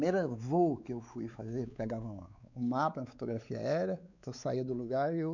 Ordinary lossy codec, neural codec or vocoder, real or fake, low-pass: none; codec, 16 kHz, 4 kbps, X-Codec, WavLM features, trained on Multilingual LibriSpeech; fake; 7.2 kHz